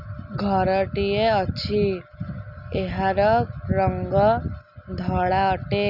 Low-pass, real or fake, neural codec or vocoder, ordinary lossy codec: 5.4 kHz; real; none; none